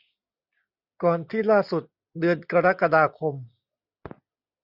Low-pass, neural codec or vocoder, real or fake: 5.4 kHz; none; real